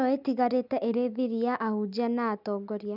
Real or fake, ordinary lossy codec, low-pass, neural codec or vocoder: real; none; 5.4 kHz; none